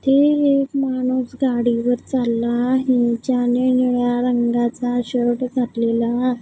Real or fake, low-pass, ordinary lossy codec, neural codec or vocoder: real; none; none; none